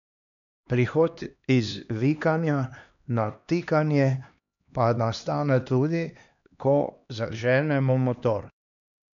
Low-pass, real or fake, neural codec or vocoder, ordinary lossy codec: 7.2 kHz; fake; codec, 16 kHz, 2 kbps, X-Codec, HuBERT features, trained on LibriSpeech; MP3, 96 kbps